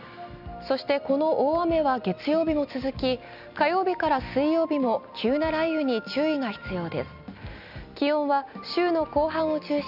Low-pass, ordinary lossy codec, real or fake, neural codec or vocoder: 5.4 kHz; none; real; none